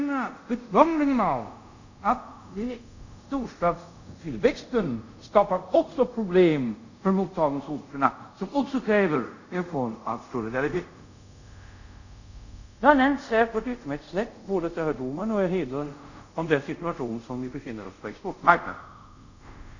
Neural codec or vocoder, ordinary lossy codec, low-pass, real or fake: codec, 24 kHz, 0.5 kbps, DualCodec; none; 7.2 kHz; fake